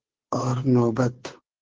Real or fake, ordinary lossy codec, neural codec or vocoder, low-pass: fake; Opus, 16 kbps; codec, 16 kHz, 2 kbps, FunCodec, trained on Chinese and English, 25 frames a second; 7.2 kHz